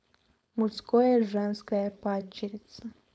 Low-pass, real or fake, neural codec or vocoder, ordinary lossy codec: none; fake; codec, 16 kHz, 4.8 kbps, FACodec; none